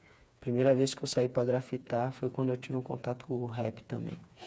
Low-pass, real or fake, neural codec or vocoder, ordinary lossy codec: none; fake; codec, 16 kHz, 4 kbps, FreqCodec, smaller model; none